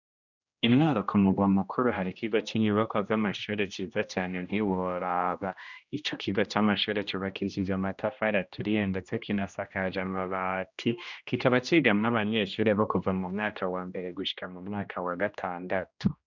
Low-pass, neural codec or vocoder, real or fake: 7.2 kHz; codec, 16 kHz, 1 kbps, X-Codec, HuBERT features, trained on general audio; fake